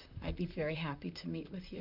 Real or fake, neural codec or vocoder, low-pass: fake; vocoder, 44.1 kHz, 128 mel bands, Pupu-Vocoder; 5.4 kHz